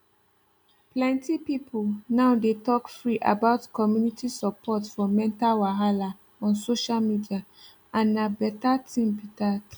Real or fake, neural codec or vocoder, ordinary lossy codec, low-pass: real; none; none; none